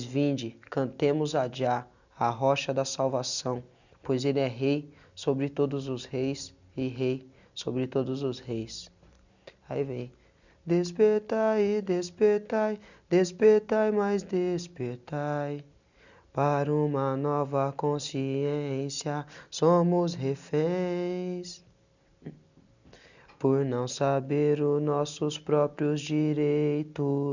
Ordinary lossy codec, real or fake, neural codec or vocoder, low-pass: none; real; none; 7.2 kHz